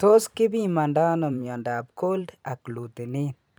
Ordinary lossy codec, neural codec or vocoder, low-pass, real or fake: none; vocoder, 44.1 kHz, 128 mel bands every 512 samples, BigVGAN v2; none; fake